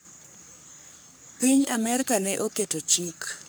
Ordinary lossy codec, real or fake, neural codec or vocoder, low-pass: none; fake; codec, 44.1 kHz, 3.4 kbps, Pupu-Codec; none